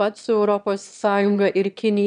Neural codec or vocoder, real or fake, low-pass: autoencoder, 22.05 kHz, a latent of 192 numbers a frame, VITS, trained on one speaker; fake; 9.9 kHz